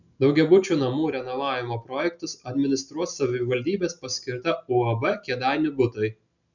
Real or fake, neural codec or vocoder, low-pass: real; none; 7.2 kHz